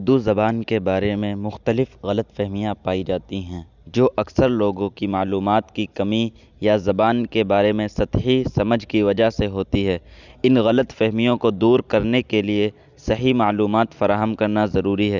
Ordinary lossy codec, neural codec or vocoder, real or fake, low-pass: none; none; real; 7.2 kHz